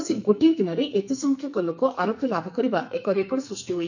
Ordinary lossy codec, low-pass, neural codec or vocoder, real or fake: none; 7.2 kHz; codec, 44.1 kHz, 2.6 kbps, SNAC; fake